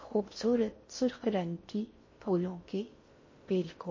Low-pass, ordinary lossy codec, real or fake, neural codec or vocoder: 7.2 kHz; MP3, 32 kbps; fake; codec, 16 kHz in and 24 kHz out, 0.6 kbps, FocalCodec, streaming, 4096 codes